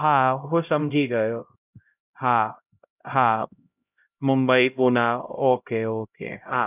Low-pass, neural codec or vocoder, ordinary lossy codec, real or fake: 3.6 kHz; codec, 16 kHz, 0.5 kbps, X-Codec, HuBERT features, trained on LibriSpeech; none; fake